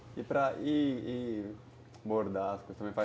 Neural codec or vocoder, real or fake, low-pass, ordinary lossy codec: none; real; none; none